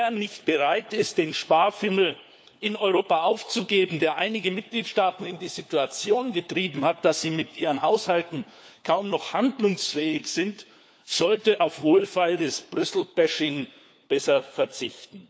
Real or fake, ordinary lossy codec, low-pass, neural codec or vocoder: fake; none; none; codec, 16 kHz, 4 kbps, FunCodec, trained on LibriTTS, 50 frames a second